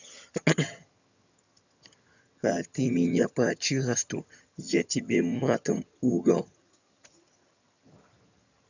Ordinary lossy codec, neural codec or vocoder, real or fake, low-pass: none; vocoder, 22.05 kHz, 80 mel bands, HiFi-GAN; fake; 7.2 kHz